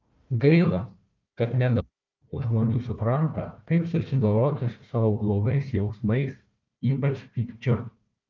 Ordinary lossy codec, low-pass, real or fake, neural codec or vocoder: Opus, 24 kbps; 7.2 kHz; fake; codec, 16 kHz, 1 kbps, FunCodec, trained on Chinese and English, 50 frames a second